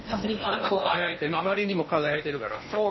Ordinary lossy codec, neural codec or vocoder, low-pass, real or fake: MP3, 24 kbps; codec, 16 kHz in and 24 kHz out, 0.8 kbps, FocalCodec, streaming, 65536 codes; 7.2 kHz; fake